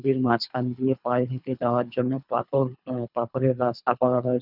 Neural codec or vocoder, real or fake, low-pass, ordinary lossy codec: codec, 24 kHz, 3 kbps, HILCodec; fake; 5.4 kHz; none